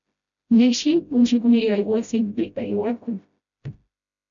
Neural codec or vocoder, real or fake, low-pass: codec, 16 kHz, 0.5 kbps, FreqCodec, smaller model; fake; 7.2 kHz